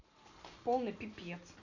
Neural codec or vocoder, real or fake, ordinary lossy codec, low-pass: none; real; none; 7.2 kHz